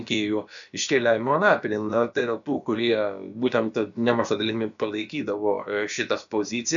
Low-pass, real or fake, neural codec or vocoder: 7.2 kHz; fake; codec, 16 kHz, about 1 kbps, DyCAST, with the encoder's durations